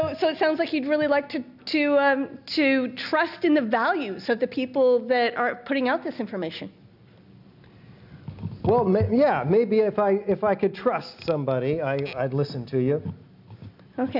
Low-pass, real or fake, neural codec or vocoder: 5.4 kHz; real; none